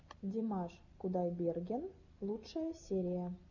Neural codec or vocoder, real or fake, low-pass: none; real; 7.2 kHz